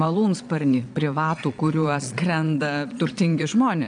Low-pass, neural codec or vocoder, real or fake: 9.9 kHz; vocoder, 22.05 kHz, 80 mel bands, Vocos; fake